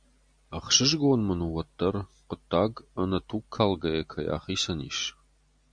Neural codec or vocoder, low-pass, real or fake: none; 9.9 kHz; real